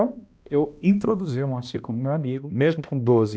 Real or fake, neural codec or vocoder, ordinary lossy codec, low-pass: fake; codec, 16 kHz, 1 kbps, X-Codec, HuBERT features, trained on balanced general audio; none; none